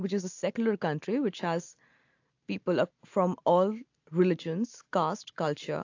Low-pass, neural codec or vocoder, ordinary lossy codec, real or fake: 7.2 kHz; none; AAC, 48 kbps; real